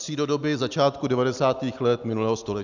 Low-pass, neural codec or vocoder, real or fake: 7.2 kHz; none; real